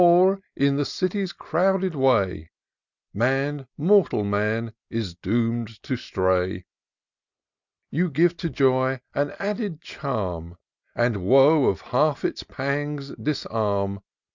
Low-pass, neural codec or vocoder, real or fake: 7.2 kHz; none; real